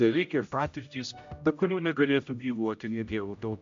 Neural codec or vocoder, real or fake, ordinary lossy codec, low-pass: codec, 16 kHz, 0.5 kbps, X-Codec, HuBERT features, trained on general audio; fake; AAC, 64 kbps; 7.2 kHz